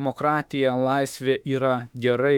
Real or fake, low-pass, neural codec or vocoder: fake; 19.8 kHz; autoencoder, 48 kHz, 32 numbers a frame, DAC-VAE, trained on Japanese speech